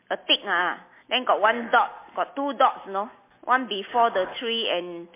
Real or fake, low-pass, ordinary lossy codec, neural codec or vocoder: real; 3.6 kHz; MP3, 24 kbps; none